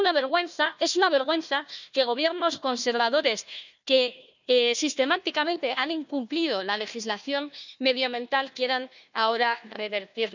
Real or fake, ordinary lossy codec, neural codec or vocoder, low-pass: fake; none; codec, 16 kHz, 1 kbps, FunCodec, trained on Chinese and English, 50 frames a second; 7.2 kHz